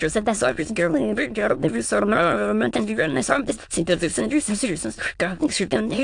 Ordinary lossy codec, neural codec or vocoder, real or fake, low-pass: AAC, 64 kbps; autoencoder, 22.05 kHz, a latent of 192 numbers a frame, VITS, trained on many speakers; fake; 9.9 kHz